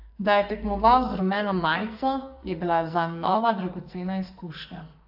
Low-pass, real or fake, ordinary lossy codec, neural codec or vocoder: 5.4 kHz; fake; AAC, 48 kbps; codec, 44.1 kHz, 2.6 kbps, SNAC